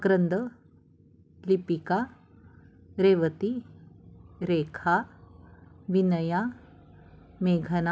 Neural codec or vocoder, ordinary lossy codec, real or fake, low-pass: none; none; real; none